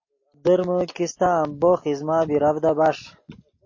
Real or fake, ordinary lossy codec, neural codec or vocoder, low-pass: real; MP3, 32 kbps; none; 7.2 kHz